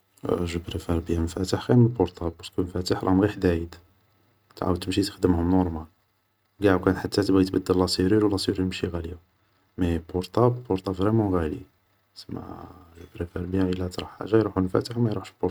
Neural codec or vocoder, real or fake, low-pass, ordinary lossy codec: none; real; none; none